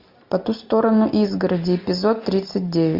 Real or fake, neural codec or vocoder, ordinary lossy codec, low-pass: real; none; MP3, 48 kbps; 5.4 kHz